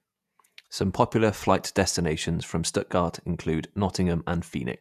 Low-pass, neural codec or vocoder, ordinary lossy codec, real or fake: 14.4 kHz; vocoder, 48 kHz, 128 mel bands, Vocos; none; fake